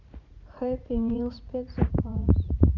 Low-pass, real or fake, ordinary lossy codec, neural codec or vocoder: 7.2 kHz; fake; none; vocoder, 44.1 kHz, 80 mel bands, Vocos